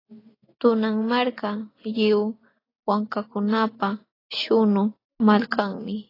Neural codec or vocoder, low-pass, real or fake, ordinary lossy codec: none; 5.4 kHz; real; AAC, 24 kbps